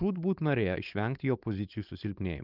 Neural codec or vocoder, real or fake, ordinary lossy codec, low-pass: codec, 16 kHz, 4.8 kbps, FACodec; fake; Opus, 24 kbps; 5.4 kHz